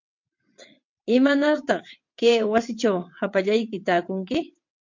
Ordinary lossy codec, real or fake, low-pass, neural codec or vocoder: MP3, 48 kbps; fake; 7.2 kHz; vocoder, 22.05 kHz, 80 mel bands, WaveNeXt